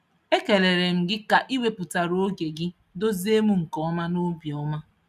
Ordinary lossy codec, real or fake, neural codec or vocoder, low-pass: none; real; none; 14.4 kHz